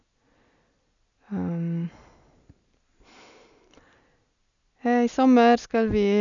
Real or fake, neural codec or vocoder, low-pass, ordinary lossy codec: real; none; 7.2 kHz; none